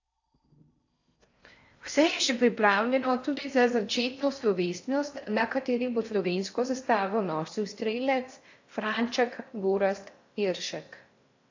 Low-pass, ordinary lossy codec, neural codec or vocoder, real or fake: 7.2 kHz; AAC, 48 kbps; codec, 16 kHz in and 24 kHz out, 0.6 kbps, FocalCodec, streaming, 4096 codes; fake